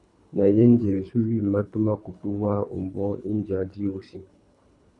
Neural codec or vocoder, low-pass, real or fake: codec, 24 kHz, 3 kbps, HILCodec; 10.8 kHz; fake